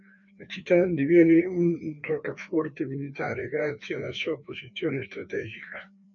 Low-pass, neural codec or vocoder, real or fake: 7.2 kHz; codec, 16 kHz, 2 kbps, FreqCodec, larger model; fake